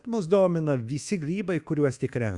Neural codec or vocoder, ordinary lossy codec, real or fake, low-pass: codec, 24 kHz, 1.2 kbps, DualCodec; Opus, 64 kbps; fake; 10.8 kHz